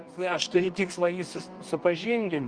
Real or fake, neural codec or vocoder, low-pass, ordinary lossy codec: fake; codec, 24 kHz, 0.9 kbps, WavTokenizer, medium music audio release; 9.9 kHz; Opus, 32 kbps